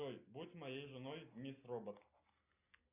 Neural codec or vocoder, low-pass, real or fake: none; 3.6 kHz; real